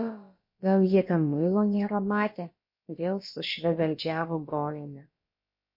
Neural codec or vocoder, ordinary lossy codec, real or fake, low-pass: codec, 16 kHz, about 1 kbps, DyCAST, with the encoder's durations; MP3, 32 kbps; fake; 5.4 kHz